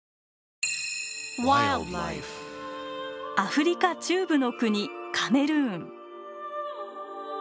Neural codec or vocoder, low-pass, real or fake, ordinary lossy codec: none; none; real; none